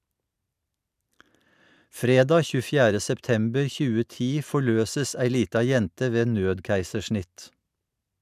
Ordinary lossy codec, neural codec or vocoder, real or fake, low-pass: none; none; real; 14.4 kHz